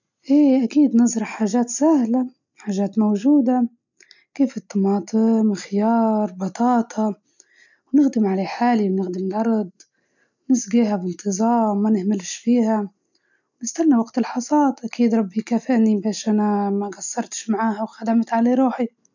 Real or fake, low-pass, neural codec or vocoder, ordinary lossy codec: real; 7.2 kHz; none; none